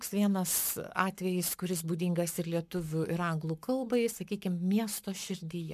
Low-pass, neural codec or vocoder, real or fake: 14.4 kHz; codec, 44.1 kHz, 7.8 kbps, Pupu-Codec; fake